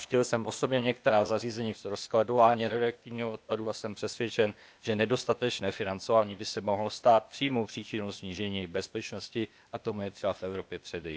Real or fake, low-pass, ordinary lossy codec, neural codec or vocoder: fake; none; none; codec, 16 kHz, 0.8 kbps, ZipCodec